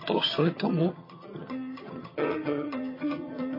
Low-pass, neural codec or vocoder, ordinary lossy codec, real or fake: 5.4 kHz; vocoder, 22.05 kHz, 80 mel bands, HiFi-GAN; MP3, 24 kbps; fake